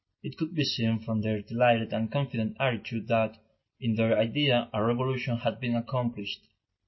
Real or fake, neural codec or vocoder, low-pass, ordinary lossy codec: real; none; 7.2 kHz; MP3, 24 kbps